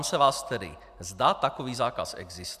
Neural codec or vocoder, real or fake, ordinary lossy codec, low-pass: none; real; AAC, 96 kbps; 14.4 kHz